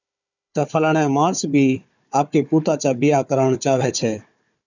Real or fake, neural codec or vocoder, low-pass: fake; codec, 16 kHz, 4 kbps, FunCodec, trained on Chinese and English, 50 frames a second; 7.2 kHz